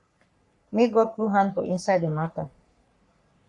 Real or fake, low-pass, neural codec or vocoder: fake; 10.8 kHz; codec, 44.1 kHz, 3.4 kbps, Pupu-Codec